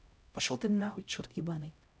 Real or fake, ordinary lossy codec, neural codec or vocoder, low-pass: fake; none; codec, 16 kHz, 0.5 kbps, X-Codec, HuBERT features, trained on LibriSpeech; none